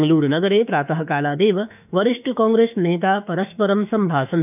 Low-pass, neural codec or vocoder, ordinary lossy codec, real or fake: 3.6 kHz; autoencoder, 48 kHz, 32 numbers a frame, DAC-VAE, trained on Japanese speech; none; fake